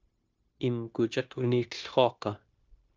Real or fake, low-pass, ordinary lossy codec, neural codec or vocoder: fake; 7.2 kHz; Opus, 24 kbps; codec, 16 kHz, 0.9 kbps, LongCat-Audio-Codec